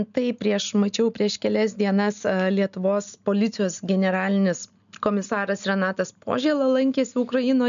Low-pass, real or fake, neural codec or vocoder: 7.2 kHz; real; none